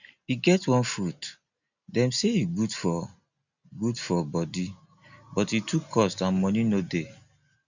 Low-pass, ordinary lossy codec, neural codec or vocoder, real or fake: 7.2 kHz; none; none; real